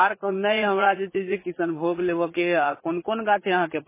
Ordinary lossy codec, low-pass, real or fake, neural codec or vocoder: MP3, 16 kbps; 3.6 kHz; fake; vocoder, 44.1 kHz, 80 mel bands, Vocos